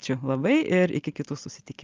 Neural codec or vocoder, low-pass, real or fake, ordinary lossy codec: none; 7.2 kHz; real; Opus, 24 kbps